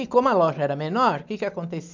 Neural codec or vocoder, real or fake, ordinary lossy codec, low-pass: none; real; MP3, 64 kbps; 7.2 kHz